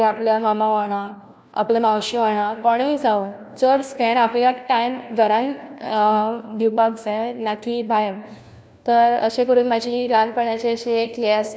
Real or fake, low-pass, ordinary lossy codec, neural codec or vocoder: fake; none; none; codec, 16 kHz, 1 kbps, FunCodec, trained on LibriTTS, 50 frames a second